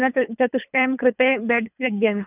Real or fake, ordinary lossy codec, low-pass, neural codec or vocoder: fake; none; 3.6 kHz; codec, 24 kHz, 3 kbps, HILCodec